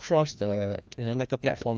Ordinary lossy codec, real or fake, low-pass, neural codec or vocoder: none; fake; none; codec, 16 kHz, 1 kbps, FreqCodec, larger model